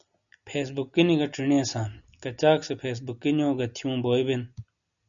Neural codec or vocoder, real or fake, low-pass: none; real; 7.2 kHz